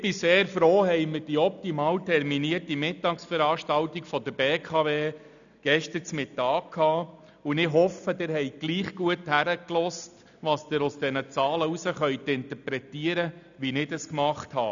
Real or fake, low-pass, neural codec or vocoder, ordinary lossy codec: real; 7.2 kHz; none; none